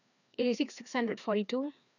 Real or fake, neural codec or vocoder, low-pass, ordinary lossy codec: fake; codec, 16 kHz, 2 kbps, FreqCodec, larger model; 7.2 kHz; none